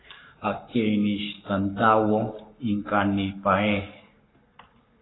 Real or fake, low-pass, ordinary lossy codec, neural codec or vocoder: fake; 7.2 kHz; AAC, 16 kbps; codec, 44.1 kHz, 7.8 kbps, Pupu-Codec